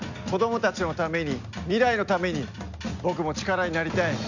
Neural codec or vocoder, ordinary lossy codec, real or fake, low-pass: none; none; real; 7.2 kHz